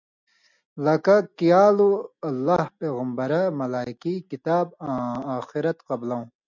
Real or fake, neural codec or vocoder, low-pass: real; none; 7.2 kHz